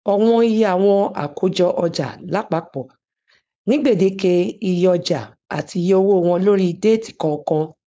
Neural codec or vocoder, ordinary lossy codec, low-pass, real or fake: codec, 16 kHz, 4.8 kbps, FACodec; none; none; fake